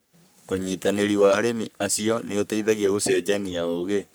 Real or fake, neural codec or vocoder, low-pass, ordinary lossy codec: fake; codec, 44.1 kHz, 3.4 kbps, Pupu-Codec; none; none